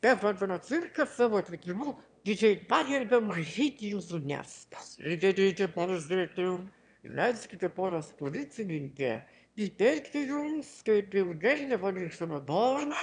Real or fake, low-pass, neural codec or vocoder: fake; 9.9 kHz; autoencoder, 22.05 kHz, a latent of 192 numbers a frame, VITS, trained on one speaker